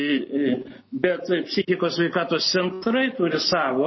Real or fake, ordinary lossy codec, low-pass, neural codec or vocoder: real; MP3, 24 kbps; 7.2 kHz; none